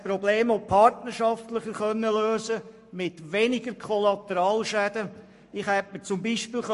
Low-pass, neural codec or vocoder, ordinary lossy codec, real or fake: 14.4 kHz; codec, 44.1 kHz, 7.8 kbps, Pupu-Codec; MP3, 48 kbps; fake